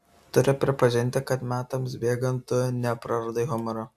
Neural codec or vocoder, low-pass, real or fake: none; 14.4 kHz; real